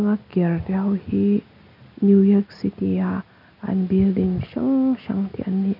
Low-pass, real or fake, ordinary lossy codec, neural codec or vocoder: 5.4 kHz; real; none; none